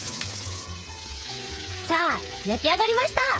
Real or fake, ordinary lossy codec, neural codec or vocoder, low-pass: fake; none; codec, 16 kHz, 8 kbps, FreqCodec, smaller model; none